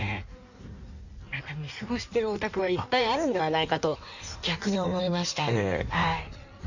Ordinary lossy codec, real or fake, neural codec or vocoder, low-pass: none; fake; codec, 16 kHz in and 24 kHz out, 1.1 kbps, FireRedTTS-2 codec; 7.2 kHz